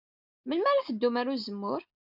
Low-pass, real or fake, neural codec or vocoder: 5.4 kHz; real; none